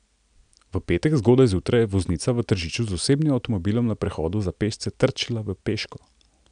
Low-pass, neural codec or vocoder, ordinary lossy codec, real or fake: 9.9 kHz; none; none; real